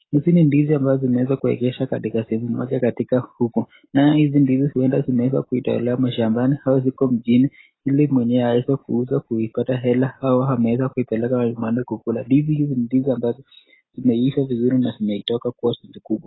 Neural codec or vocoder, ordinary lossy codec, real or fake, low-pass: none; AAC, 16 kbps; real; 7.2 kHz